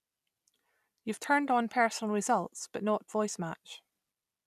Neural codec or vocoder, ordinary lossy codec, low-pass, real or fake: none; none; 14.4 kHz; real